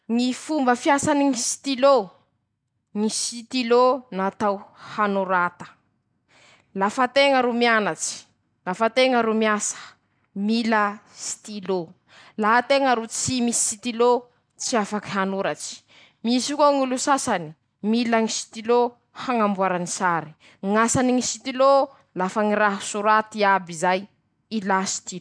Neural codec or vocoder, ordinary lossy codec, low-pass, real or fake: none; none; 9.9 kHz; real